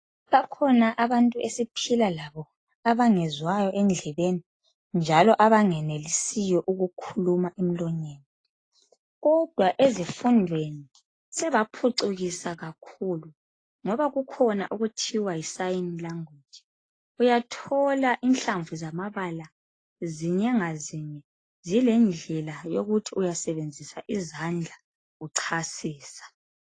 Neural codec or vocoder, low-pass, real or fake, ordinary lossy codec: none; 9.9 kHz; real; AAC, 32 kbps